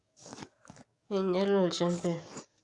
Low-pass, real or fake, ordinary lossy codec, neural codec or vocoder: 10.8 kHz; fake; none; codec, 44.1 kHz, 7.8 kbps, DAC